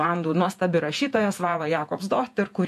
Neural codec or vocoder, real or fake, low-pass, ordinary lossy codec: vocoder, 48 kHz, 128 mel bands, Vocos; fake; 14.4 kHz; MP3, 64 kbps